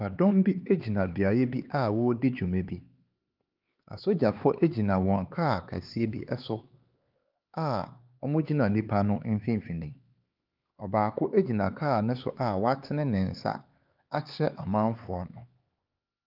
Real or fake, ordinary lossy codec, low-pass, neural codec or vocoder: fake; Opus, 32 kbps; 5.4 kHz; codec, 16 kHz, 4 kbps, X-Codec, HuBERT features, trained on LibriSpeech